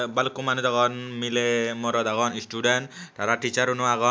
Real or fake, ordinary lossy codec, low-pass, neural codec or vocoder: real; none; none; none